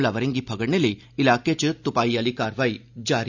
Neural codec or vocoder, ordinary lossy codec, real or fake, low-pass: none; none; real; 7.2 kHz